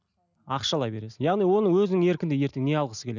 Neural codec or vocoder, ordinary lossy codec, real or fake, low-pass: none; none; real; 7.2 kHz